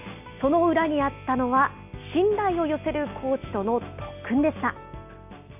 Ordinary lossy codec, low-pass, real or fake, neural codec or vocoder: none; 3.6 kHz; real; none